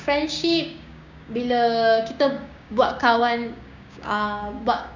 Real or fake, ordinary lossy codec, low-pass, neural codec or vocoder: fake; none; 7.2 kHz; codec, 16 kHz, 6 kbps, DAC